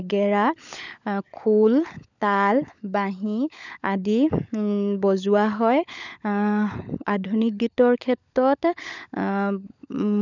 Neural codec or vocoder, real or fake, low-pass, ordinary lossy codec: codec, 16 kHz, 16 kbps, FunCodec, trained on LibriTTS, 50 frames a second; fake; 7.2 kHz; none